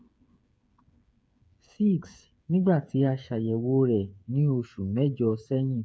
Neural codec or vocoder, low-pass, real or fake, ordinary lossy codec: codec, 16 kHz, 8 kbps, FreqCodec, smaller model; none; fake; none